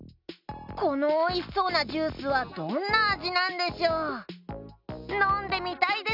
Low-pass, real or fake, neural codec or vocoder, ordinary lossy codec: 5.4 kHz; real; none; none